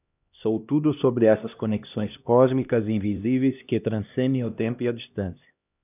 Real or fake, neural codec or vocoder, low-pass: fake; codec, 16 kHz, 1 kbps, X-Codec, HuBERT features, trained on LibriSpeech; 3.6 kHz